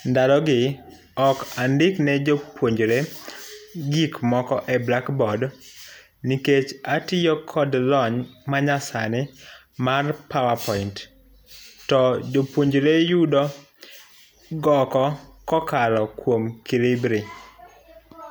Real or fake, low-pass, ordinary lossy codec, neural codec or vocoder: real; none; none; none